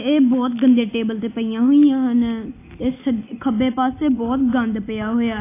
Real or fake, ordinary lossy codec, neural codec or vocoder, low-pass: real; AAC, 24 kbps; none; 3.6 kHz